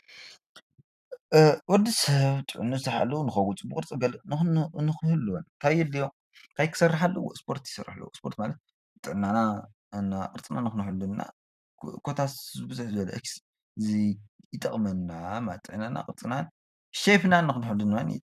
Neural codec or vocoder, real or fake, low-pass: none; real; 14.4 kHz